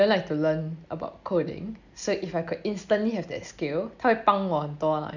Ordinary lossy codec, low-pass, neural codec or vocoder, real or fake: none; 7.2 kHz; none; real